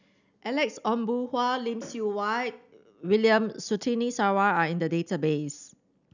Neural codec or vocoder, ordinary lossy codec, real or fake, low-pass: none; none; real; 7.2 kHz